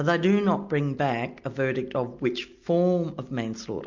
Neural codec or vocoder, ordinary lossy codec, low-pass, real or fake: none; MP3, 64 kbps; 7.2 kHz; real